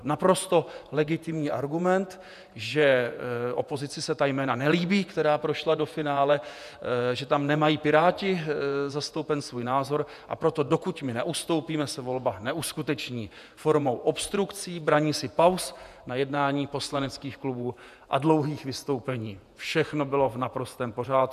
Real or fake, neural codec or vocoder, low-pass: fake; vocoder, 48 kHz, 128 mel bands, Vocos; 14.4 kHz